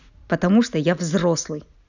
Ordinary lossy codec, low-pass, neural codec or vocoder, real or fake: none; 7.2 kHz; none; real